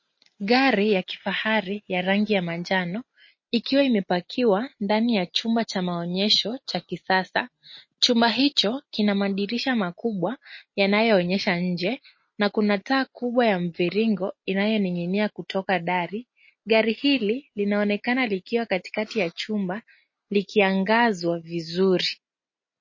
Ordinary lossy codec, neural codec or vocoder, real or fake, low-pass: MP3, 32 kbps; none; real; 7.2 kHz